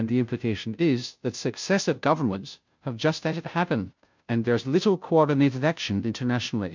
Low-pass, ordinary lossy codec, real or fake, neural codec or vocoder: 7.2 kHz; MP3, 64 kbps; fake; codec, 16 kHz, 0.5 kbps, FunCodec, trained on Chinese and English, 25 frames a second